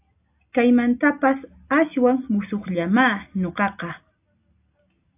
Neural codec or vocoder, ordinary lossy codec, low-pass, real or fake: none; AAC, 32 kbps; 3.6 kHz; real